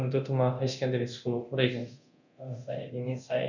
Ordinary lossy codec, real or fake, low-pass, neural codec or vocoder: none; fake; 7.2 kHz; codec, 24 kHz, 0.9 kbps, DualCodec